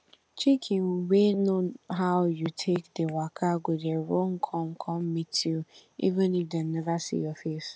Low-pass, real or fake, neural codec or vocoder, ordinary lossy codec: none; real; none; none